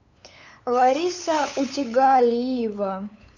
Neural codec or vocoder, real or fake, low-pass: codec, 16 kHz, 8 kbps, FunCodec, trained on LibriTTS, 25 frames a second; fake; 7.2 kHz